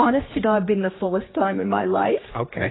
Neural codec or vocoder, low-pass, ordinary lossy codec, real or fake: codec, 16 kHz, 2 kbps, X-Codec, HuBERT features, trained on general audio; 7.2 kHz; AAC, 16 kbps; fake